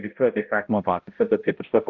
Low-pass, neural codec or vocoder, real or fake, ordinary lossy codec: 7.2 kHz; codec, 16 kHz, 0.5 kbps, X-Codec, HuBERT features, trained on balanced general audio; fake; Opus, 32 kbps